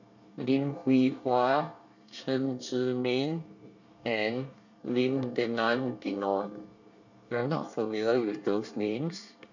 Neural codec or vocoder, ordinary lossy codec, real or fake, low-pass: codec, 24 kHz, 1 kbps, SNAC; none; fake; 7.2 kHz